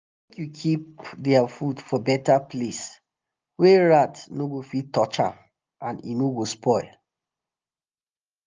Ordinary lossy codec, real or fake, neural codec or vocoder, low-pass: Opus, 24 kbps; real; none; 7.2 kHz